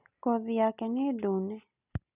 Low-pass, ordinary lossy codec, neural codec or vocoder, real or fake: 3.6 kHz; none; none; real